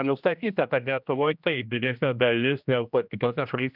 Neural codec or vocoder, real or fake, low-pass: codec, 16 kHz, 1 kbps, X-Codec, HuBERT features, trained on general audio; fake; 5.4 kHz